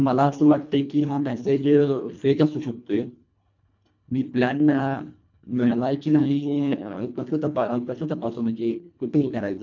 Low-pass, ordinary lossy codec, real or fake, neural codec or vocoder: 7.2 kHz; MP3, 64 kbps; fake; codec, 24 kHz, 1.5 kbps, HILCodec